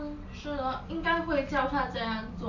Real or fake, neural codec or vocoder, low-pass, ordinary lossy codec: real; none; 7.2 kHz; none